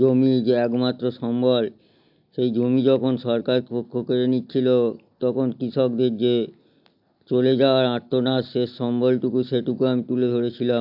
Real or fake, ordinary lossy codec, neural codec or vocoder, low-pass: real; AAC, 48 kbps; none; 5.4 kHz